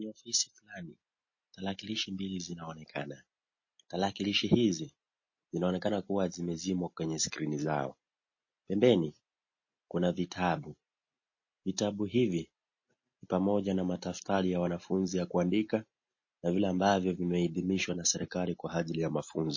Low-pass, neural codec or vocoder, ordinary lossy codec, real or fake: 7.2 kHz; none; MP3, 32 kbps; real